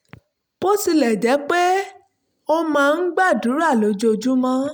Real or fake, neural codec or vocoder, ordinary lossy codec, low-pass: real; none; none; none